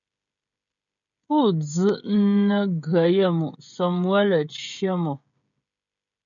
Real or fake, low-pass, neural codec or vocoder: fake; 7.2 kHz; codec, 16 kHz, 16 kbps, FreqCodec, smaller model